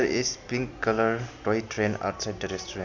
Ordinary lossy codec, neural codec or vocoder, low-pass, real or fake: none; none; 7.2 kHz; real